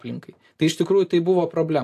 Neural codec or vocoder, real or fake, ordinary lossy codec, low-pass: none; real; AAC, 64 kbps; 14.4 kHz